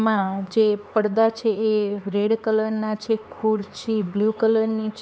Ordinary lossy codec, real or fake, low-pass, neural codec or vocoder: none; fake; none; codec, 16 kHz, 4 kbps, X-Codec, HuBERT features, trained on LibriSpeech